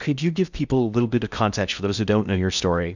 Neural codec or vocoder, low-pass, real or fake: codec, 16 kHz in and 24 kHz out, 0.6 kbps, FocalCodec, streaming, 2048 codes; 7.2 kHz; fake